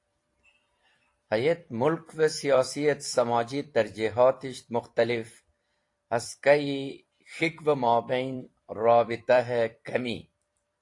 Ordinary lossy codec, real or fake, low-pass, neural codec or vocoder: AAC, 48 kbps; fake; 10.8 kHz; vocoder, 44.1 kHz, 128 mel bands every 256 samples, BigVGAN v2